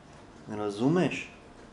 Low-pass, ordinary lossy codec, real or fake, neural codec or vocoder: 10.8 kHz; none; real; none